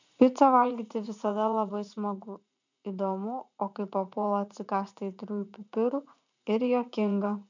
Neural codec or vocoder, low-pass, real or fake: vocoder, 44.1 kHz, 80 mel bands, Vocos; 7.2 kHz; fake